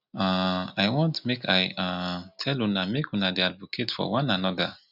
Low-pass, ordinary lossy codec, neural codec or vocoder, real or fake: 5.4 kHz; none; none; real